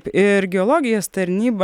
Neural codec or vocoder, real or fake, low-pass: none; real; 19.8 kHz